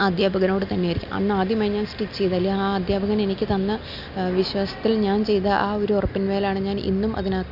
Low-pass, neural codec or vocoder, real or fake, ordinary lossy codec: 5.4 kHz; none; real; none